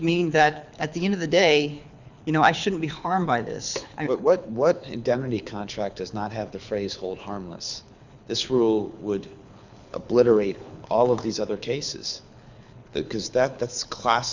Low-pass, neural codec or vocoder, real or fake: 7.2 kHz; codec, 24 kHz, 6 kbps, HILCodec; fake